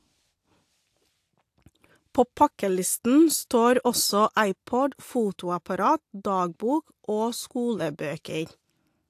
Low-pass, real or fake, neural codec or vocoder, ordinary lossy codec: 14.4 kHz; real; none; AAC, 64 kbps